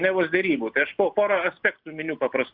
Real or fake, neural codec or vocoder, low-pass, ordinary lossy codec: real; none; 5.4 kHz; Opus, 64 kbps